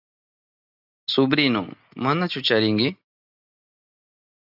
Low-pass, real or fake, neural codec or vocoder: 5.4 kHz; real; none